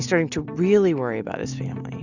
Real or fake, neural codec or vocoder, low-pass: real; none; 7.2 kHz